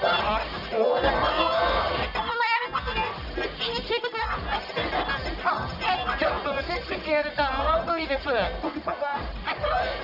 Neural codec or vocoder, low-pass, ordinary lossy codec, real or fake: codec, 44.1 kHz, 1.7 kbps, Pupu-Codec; 5.4 kHz; none; fake